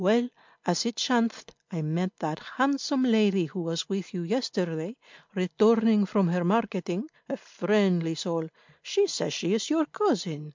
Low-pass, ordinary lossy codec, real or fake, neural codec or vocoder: 7.2 kHz; MP3, 64 kbps; real; none